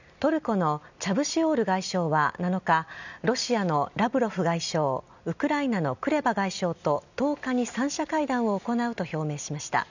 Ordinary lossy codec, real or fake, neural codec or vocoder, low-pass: none; real; none; 7.2 kHz